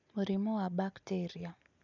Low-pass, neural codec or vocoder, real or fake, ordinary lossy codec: 7.2 kHz; none; real; none